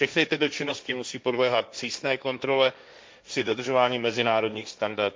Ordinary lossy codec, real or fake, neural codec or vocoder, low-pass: none; fake; codec, 16 kHz, 1.1 kbps, Voila-Tokenizer; none